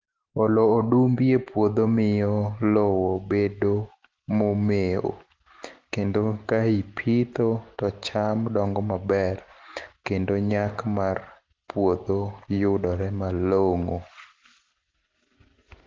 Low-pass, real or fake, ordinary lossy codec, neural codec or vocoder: 7.2 kHz; real; Opus, 16 kbps; none